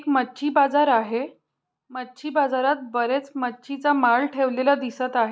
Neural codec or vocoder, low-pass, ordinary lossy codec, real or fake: none; none; none; real